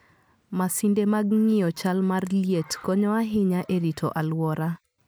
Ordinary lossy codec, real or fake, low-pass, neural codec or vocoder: none; real; none; none